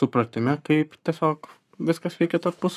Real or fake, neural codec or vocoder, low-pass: fake; codec, 44.1 kHz, 7.8 kbps, Pupu-Codec; 14.4 kHz